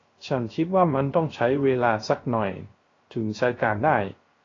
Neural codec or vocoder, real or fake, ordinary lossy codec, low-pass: codec, 16 kHz, 0.3 kbps, FocalCodec; fake; AAC, 32 kbps; 7.2 kHz